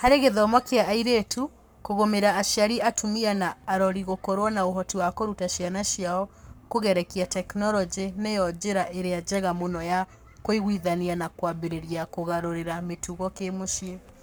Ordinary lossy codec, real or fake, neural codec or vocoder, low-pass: none; fake; codec, 44.1 kHz, 7.8 kbps, Pupu-Codec; none